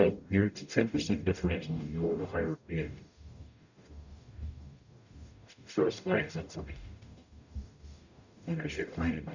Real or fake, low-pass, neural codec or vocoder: fake; 7.2 kHz; codec, 44.1 kHz, 0.9 kbps, DAC